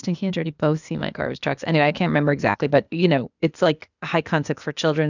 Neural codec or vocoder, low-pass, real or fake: codec, 16 kHz, 0.8 kbps, ZipCodec; 7.2 kHz; fake